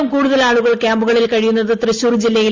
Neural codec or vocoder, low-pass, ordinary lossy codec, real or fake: none; 7.2 kHz; Opus, 32 kbps; real